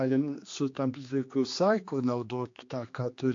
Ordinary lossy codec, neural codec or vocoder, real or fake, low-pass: AAC, 48 kbps; codec, 16 kHz, 2 kbps, X-Codec, HuBERT features, trained on general audio; fake; 7.2 kHz